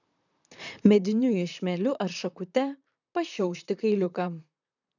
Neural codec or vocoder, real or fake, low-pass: vocoder, 44.1 kHz, 128 mel bands, Pupu-Vocoder; fake; 7.2 kHz